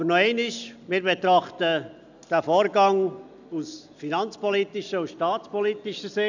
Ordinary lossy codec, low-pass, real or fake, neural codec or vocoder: none; 7.2 kHz; real; none